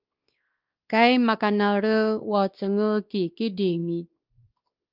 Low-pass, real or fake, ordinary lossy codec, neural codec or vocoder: 5.4 kHz; fake; Opus, 32 kbps; codec, 16 kHz, 2 kbps, X-Codec, WavLM features, trained on Multilingual LibriSpeech